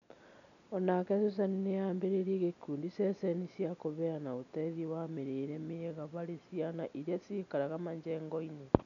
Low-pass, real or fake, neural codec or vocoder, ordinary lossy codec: 7.2 kHz; real; none; none